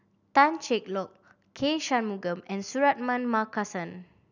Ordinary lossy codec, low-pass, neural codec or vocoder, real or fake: none; 7.2 kHz; none; real